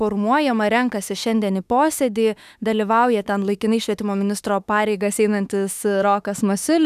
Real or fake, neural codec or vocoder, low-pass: fake; autoencoder, 48 kHz, 32 numbers a frame, DAC-VAE, trained on Japanese speech; 14.4 kHz